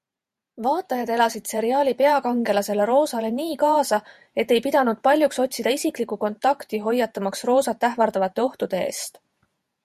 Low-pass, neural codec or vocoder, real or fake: 14.4 kHz; vocoder, 48 kHz, 128 mel bands, Vocos; fake